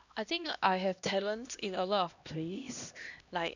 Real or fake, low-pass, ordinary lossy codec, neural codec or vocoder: fake; 7.2 kHz; none; codec, 16 kHz, 1 kbps, X-Codec, HuBERT features, trained on LibriSpeech